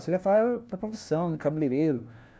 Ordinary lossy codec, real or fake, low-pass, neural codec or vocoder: none; fake; none; codec, 16 kHz, 1 kbps, FunCodec, trained on LibriTTS, 50 frames a second